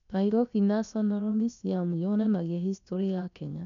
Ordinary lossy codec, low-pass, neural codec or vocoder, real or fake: none; 7.2 kHz; codec, 16 kHz, about 1 kbps, DyCAST, with the encoder's durations; fake